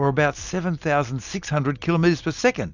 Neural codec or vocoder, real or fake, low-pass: none; real; 7.2 kHz